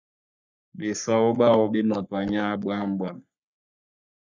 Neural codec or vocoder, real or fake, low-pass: codec, 44.1 kHz, 3.4 kbps, Pupu-Codec; fake; 7.2 kHz